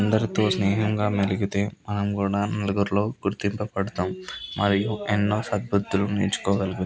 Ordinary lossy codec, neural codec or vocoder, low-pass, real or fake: none; none; none; real